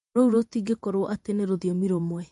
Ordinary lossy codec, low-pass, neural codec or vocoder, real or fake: MP3, 48 kbps; 14.4 kHz; vocoder, 48 kHz, 128 mel bands, Vocos; fake